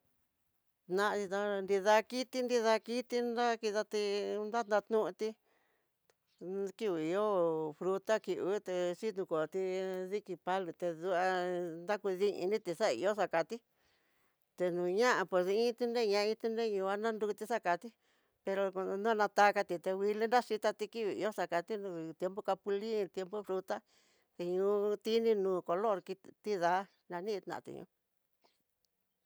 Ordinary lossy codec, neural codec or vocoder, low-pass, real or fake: none; none; none; real